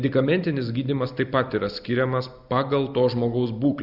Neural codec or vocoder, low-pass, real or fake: none; 5.4 kHz; real